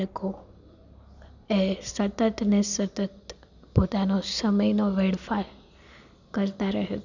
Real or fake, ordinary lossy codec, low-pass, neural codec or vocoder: real; none; 7.2 kHz; none